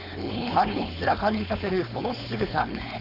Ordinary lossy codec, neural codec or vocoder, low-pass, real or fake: none; codec, 16 kHz, 4.8 kbps, FACodec; 5.4 kHz; fake